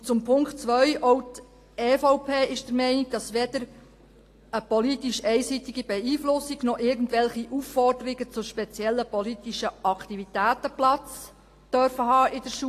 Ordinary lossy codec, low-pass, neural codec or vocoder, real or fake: AAC, 48 kbps; 14.4 kHz; none; real